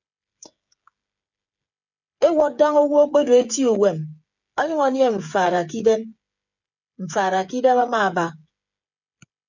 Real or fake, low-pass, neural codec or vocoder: fake; 7.2 kHz; codec, 16 kHz, 8 kbps, FreqCodec, smaller model